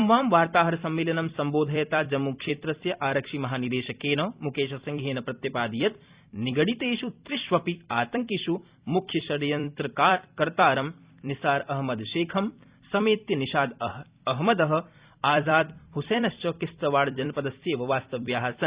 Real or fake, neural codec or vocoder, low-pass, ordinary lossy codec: fake; vocoder, 44.1 kHz, 128 mel bands every 256 samples, BigVGAN v2; 3.6 kHz; Opus, 64 kbps